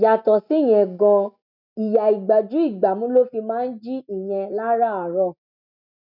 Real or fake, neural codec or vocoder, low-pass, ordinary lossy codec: real; none; 5.4 kHz; none